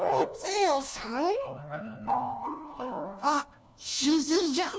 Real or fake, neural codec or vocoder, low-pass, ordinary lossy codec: fake; codec, 16 kHz, 1 kbps, FunCodec, trained on LibriTTS, 50 frames a second; none; none